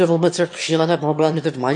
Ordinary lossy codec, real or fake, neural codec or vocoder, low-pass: MP3, 48 kbps; fake; autoencoder, 22.05 kHz, a latent of 192 numbers a frame, VITS, trained on one speaker; 9.9 kHz